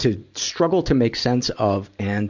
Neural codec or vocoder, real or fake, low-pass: none; real; 7.2 kHz